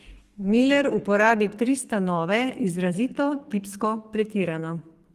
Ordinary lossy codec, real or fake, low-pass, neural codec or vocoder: Opus, 24 kbps; fake; 14.4 kHz; codec, 44.1 kHz, 2.6 kbps, SNAC